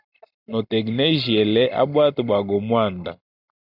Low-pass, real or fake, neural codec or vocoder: 5.4 kHz; real; none